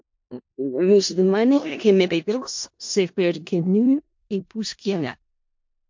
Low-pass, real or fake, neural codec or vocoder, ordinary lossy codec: 7.2 kHz; fake; codec, 16 kHz in and 24 kHz out, 0.4 kbps, LongCat-Audio-Codec, four codebook decoder; MP3, 48 kbps